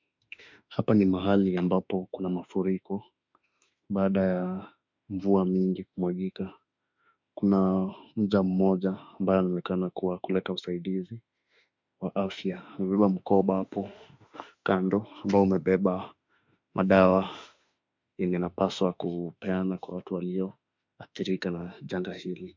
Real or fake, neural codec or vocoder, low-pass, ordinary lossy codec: fake; autoencoder, 48 kHz, 32 numbers a frame, DAC-VAE, trained on Japanese speech; 7.2 kHz; MP3, 64 kbps